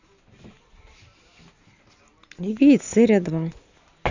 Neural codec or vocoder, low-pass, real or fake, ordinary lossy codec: none; 7.2 kHz; real; Opus, 64 kbps